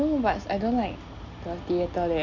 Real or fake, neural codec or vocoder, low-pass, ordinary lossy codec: real; none; 7.2 kHz; none